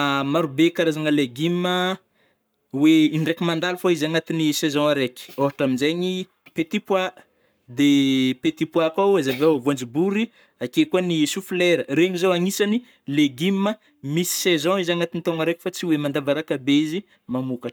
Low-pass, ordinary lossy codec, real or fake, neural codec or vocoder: none; none; fake; codec, 44.1 kHz, 7.8 kbps, Pupu-Codec